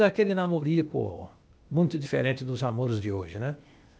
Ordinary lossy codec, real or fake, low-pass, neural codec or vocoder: none; fake; none; codec, 16 kHz, 0.8 kbps, ZipCodec